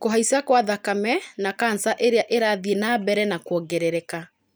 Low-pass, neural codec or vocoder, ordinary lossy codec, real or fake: none; none; none; real